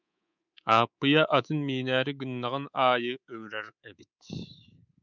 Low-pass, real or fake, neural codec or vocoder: 7.2 kHz; fake; codec, 24 kHz, 3.1 kbps, DualCodec